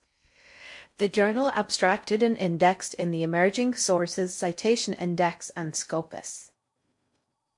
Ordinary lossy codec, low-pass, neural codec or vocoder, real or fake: MP3, 64 kbps; 10.8 kHz; codec, 16 kHz in and 24 kHz out, 0.6 kbps, FocalCodec, streaming, 4096 codes; fake